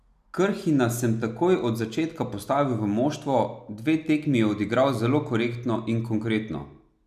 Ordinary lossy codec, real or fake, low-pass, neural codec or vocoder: none; real; 14.4 kHz; none